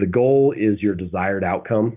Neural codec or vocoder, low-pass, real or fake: none; 3.6 kHz; real